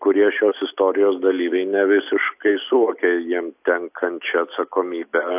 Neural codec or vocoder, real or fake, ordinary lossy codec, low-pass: none; real; AAC, 32 kbps; 3.6 kHz